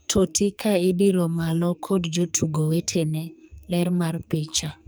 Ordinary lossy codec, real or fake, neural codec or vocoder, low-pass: none; fake; codec, 44.1 kHz, 2.6 kbps, SNAC; none